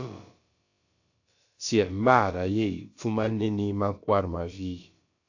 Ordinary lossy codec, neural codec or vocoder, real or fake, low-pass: AAC, 48 kbps; codec, 16 kHz, about 1 kbps, DyCAST, with the encoder's durations; fake; 7.2 kHz